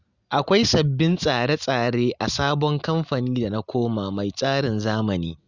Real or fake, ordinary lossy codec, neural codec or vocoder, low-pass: real; Opus, 64 kbps; none; 7.2 kHz